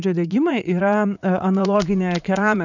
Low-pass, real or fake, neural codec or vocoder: 7.2 kHz; fake; vocoder, 44.1 kHz, 80 mel bands, Vocos